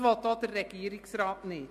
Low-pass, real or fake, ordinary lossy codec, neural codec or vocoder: 14.4 kHz; real; MP3, 64 kbps; none